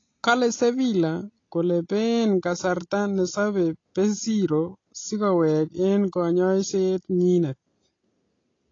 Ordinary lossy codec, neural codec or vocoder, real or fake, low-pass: AAC, 32 kbps; none; real; 7.2 kHz